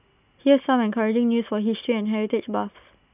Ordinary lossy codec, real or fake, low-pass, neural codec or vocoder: none; real; 3.6 kHz; none